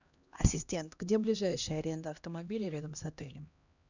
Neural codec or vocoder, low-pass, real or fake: codec, 16 kHz, 1 kbps, X-Codec, HuBERT features, trained on LibriSpeech; 7.2 kHz; fake